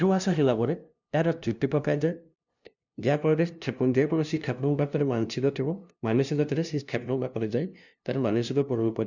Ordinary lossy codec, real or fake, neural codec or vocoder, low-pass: none; fake; codec, 16 kHz, 0.5 kbps, FunCodec, trained on LibriTTS, 25 frames a second; 7.2 kHz